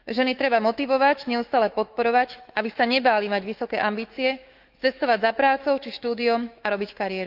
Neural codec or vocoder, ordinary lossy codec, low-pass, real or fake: autoencoder, 48 kHz, 128 numbers a frame, DAC-VAE, trained on Japanese speech; Opus, 32 kbps; 5.4 kHz; fake